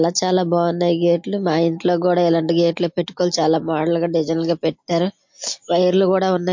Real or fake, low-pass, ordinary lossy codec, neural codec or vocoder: real; 7.2 kHz; MP3, 48 kbps; none